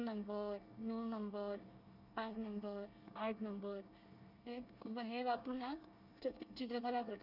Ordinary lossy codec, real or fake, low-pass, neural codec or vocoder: none; fake; 5.4 kHz; codec, 24 kHz, 1 kbps, SNAC